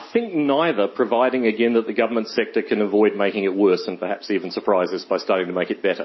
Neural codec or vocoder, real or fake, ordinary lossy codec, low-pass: none; real; MP3, 24 kbps; 7.2 kHz